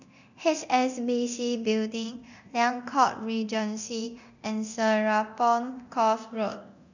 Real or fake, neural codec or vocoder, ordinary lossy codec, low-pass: fake; codec, 24 kHz, 0.9 kbps, DualCodec; none; 7.2 kHz